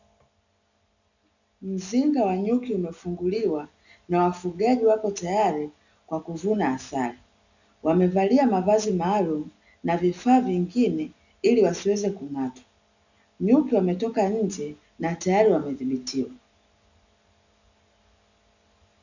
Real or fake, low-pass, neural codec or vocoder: real; 7.2 kHz; none